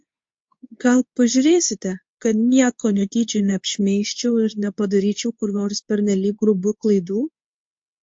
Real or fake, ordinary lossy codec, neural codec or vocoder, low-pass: fake; MP3, 48 kbps; codec, 24 kHz, 0.9 kbps, WavTokenizer, medium speech release version 2; 9.9 kHz